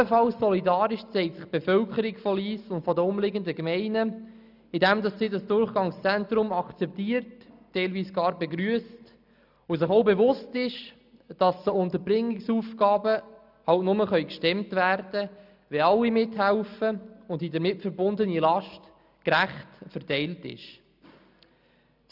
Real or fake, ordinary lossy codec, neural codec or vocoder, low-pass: real; none; none; 5.4 kHz